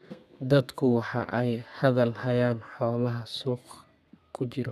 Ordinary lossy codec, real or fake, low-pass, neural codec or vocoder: none; fake; 14.4 kHz; codec, 32 kHz, 1.9 kbps, SNAC